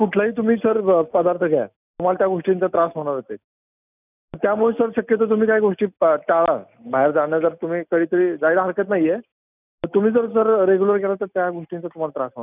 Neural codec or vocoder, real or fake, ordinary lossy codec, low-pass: none; real; none; 3.6 kHz